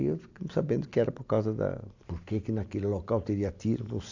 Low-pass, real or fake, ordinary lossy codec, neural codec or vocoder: 7.2 kHz; real; AAC, 48 kbps; none